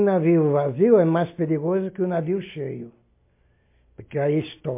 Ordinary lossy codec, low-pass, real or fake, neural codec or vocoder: MP3, 24 kbps; 3.6 kHz; real; none